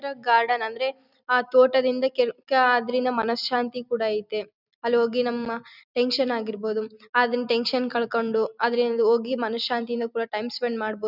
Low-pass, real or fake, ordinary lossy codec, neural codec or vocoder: 5.4 kHz; real; none; none